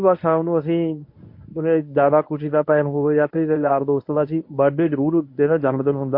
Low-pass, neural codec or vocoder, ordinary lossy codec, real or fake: 5.4 kHz; codec, 24 kHz, 0.9 kbps, WavTokenizer, medium speech release version 1; MP3, 32 kbps; fake